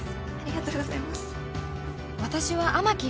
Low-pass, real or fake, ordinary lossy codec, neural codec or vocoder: none; real; none; none